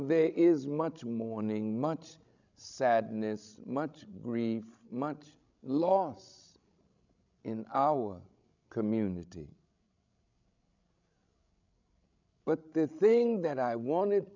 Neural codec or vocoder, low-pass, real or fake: codec, 16 kHz, 16 kbps, FreqCodec, larger model; 7.2 kHz; fake